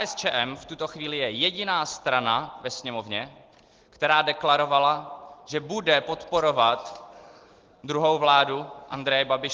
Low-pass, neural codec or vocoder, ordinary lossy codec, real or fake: 7.2 kHz; none; Opus, 16 kbps; real